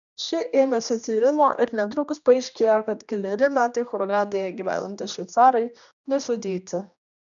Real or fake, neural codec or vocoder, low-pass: fake; codec, 16 kHz, 1 kbps, X-Codec, HuBERT features, trained on general audio; 7.2 kHz